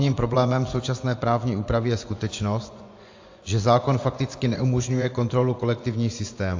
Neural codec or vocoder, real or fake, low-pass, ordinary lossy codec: vocoder, 24 kHz, 100 mel bands, Vocos; fake; 7.2 kHz; AAC, 48 kbps